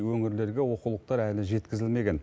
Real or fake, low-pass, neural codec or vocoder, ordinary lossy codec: real; none; none; none